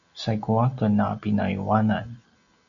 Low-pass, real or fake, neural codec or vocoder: 7.2 kHz; real; none